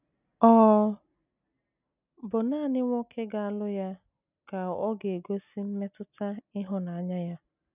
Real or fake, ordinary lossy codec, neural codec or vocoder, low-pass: real; none; none; 3.6 kHz